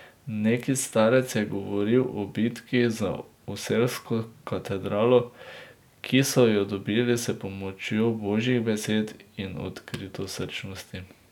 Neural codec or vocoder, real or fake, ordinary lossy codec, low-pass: none; real; none; 19.8 kHz